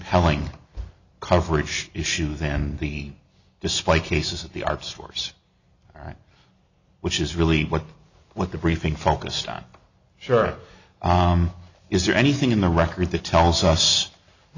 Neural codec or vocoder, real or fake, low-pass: none; real; 7.2 kHz